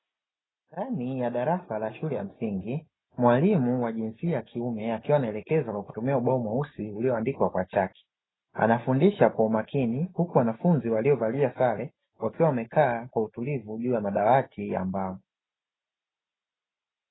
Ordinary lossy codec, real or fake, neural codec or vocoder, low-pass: AAC, 16 kbps; real; none; 7.2 kHz